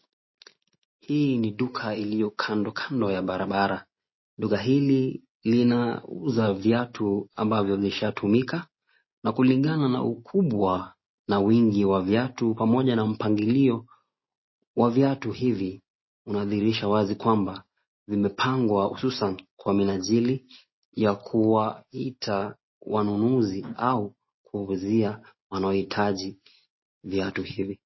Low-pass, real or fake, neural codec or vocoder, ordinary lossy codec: 7.2 kHz; real; none; MP3, 24 kbps